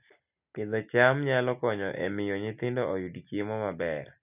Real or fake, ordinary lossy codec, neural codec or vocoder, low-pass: real; none; none; 3.6 kHz